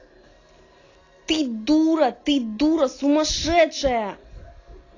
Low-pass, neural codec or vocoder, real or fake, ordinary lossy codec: 7.2 kHz; none; real; AAC, 32 kbps